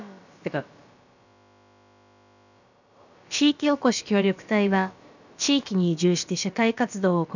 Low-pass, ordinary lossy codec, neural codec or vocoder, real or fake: 7.2 kHz; none; codec, 16 kHz, about 1 kbps, DyCAST, with the encoder's durations; fake